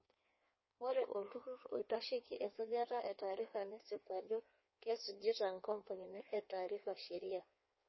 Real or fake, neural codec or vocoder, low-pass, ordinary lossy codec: fake; codec, 16 kHz in and 24 kHz out, 1.1 kbps, FireRedTTS-2 codec; 7.2 kHz; MP3, 24 kbps